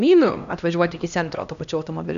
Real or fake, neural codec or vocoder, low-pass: fake; codec, 16 kHz, 1 kbps, X-Codec, HuBERT features, trained on LibriSpeech; 7.2 kHz